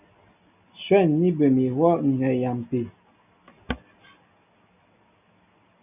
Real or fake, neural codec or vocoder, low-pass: real; none; 3.6 kHz